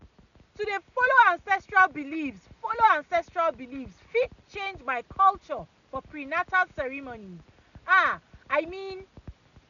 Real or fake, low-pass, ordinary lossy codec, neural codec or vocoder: real; 7.2 kHz; none; none